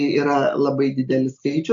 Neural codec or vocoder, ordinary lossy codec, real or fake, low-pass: none; MP3, 64 kbps; real; 7.2 kHz